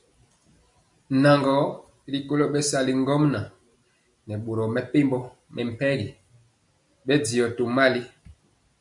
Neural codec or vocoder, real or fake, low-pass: none; real; 10.8 kHz